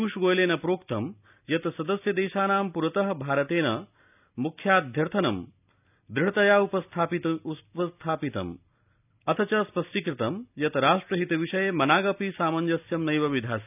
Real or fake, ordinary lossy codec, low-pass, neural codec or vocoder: real; none; 3.6 kHz; none